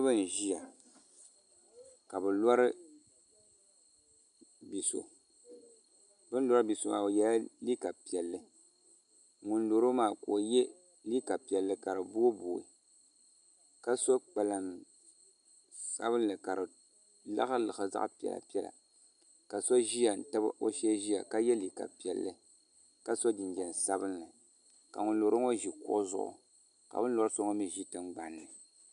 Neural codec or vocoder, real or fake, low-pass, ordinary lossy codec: none; real; 9.9 kHz; MP3, 96 kbps